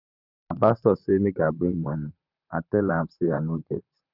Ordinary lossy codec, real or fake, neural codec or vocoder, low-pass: none; fake; vocoder, 44.1 kHz, 128 mel bands, Pupu-Vocoder; 5.4 kHz